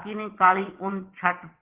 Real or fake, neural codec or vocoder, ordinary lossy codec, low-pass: fake; vocoder, 22.05 kHz, 80 mel bands, WaveNeXt; Opus, 16 kbps; 3.6 kHz